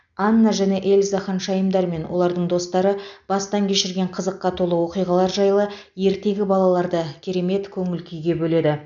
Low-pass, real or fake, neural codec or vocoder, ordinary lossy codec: 7.2 kHz; real; none; none